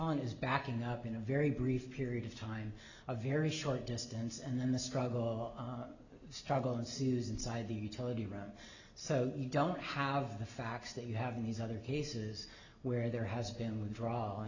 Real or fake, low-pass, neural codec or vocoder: real; 7.2 kHz; none